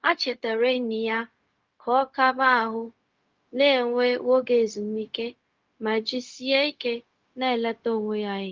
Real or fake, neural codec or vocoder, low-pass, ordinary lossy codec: fake; codec, 16 kHz, 0.4 kbps, LongCat-Audio-Codec; 7.2 kHz; Opus, 32 kbps